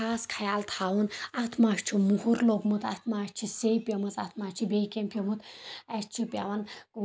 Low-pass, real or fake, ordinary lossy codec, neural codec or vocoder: none; real; none; none